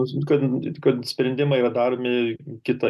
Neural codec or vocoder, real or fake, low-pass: none; real; 14.4 kHz